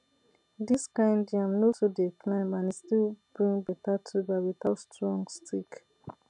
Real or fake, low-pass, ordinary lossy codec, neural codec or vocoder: real; 10.8 kHz; none; none